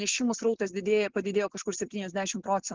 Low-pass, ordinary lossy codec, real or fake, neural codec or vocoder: 7.2 kHz; Opus, 16 kbps; real; none